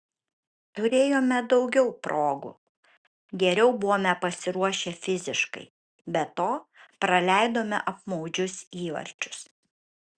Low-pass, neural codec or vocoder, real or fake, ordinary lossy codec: 9.9 kHz; none; real; Opus, 64 kbps